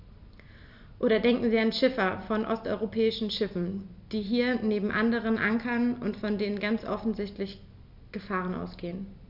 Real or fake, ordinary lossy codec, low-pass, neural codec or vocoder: real; none; 5.4 kHz; none